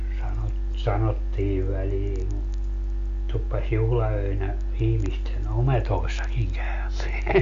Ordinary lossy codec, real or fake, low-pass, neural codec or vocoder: MP3, 48 kbps; real; 7.2 kHz; none